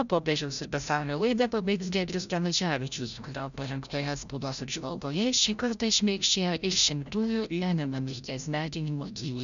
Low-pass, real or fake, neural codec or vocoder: 7.2 kHz; fake; codec, 16 kHz, 0.5 kbps, FreqCodec, larger model